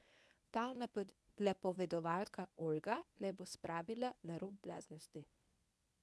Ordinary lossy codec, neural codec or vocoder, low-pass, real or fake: none; codec, 24 kHz, 0.9 kbps, WavTokenizer, medium speech release version 1; none; fake